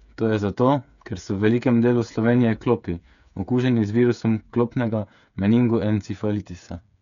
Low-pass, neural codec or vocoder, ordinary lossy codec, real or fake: 7.2 kHz; codec, 16 kHz, 8 kbps, FreqCodec, smaller model; none; fake